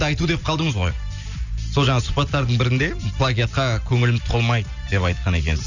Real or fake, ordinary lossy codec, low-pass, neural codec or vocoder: real; none; 7.2 kHz; none